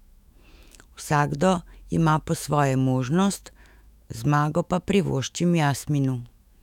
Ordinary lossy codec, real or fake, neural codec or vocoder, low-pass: none; fake; autoencoder, 48 kHz, 128 numbers a frame, DAC-VAE, trained on Japanese speech; 19.8 kHz